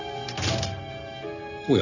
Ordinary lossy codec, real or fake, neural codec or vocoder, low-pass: none; real; none; 7.2 kHz